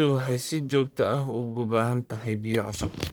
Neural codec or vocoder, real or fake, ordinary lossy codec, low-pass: codec, 44.1 kHz, 1.7 kbps, Pupu-Codec; fake; none; none